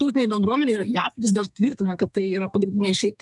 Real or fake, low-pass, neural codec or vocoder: fake; 10.8 kHz; codec, 32 kHz, 1.9 kbps, SNAC